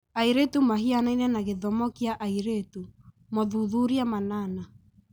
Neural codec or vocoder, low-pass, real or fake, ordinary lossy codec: none; none; real; none